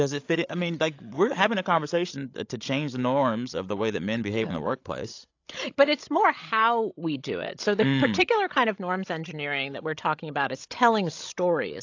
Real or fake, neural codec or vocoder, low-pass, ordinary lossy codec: fake; codec, 16 kHz, 16 kbps, FreqCodec, larger model; 7.2 kHz; AAC, 48 kbps